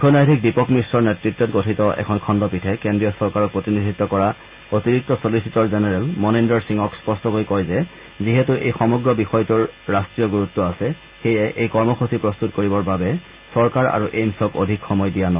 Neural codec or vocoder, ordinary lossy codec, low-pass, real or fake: none; Opus, 32 kbps; 3.6 kHz; real